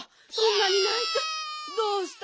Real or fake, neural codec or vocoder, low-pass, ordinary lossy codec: real; none; none; none